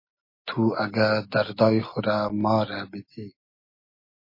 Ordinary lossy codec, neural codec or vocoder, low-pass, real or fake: MP3, 24 kbps; none; 5.4 kHz; real